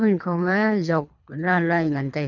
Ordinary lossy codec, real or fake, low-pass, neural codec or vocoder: none; fake; 7.2 kHz; codec, 24 kHz, 3 kbps, HILCodec